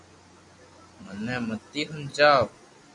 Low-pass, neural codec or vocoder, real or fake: 10.8 kHz; none; real